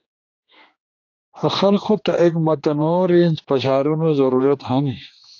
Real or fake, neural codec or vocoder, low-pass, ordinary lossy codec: fake; codec, 16 kHz, 2 kbps, X-Codec, HuBERT features, trained on general audio; 7.2 kHz; AAC, 48 kbps